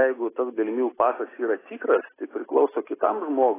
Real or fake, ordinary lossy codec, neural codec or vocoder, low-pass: real; AAC, 16 kbps; none; 3.6 kHz